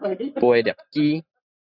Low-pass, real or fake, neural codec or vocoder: 5.4 kHz; real; none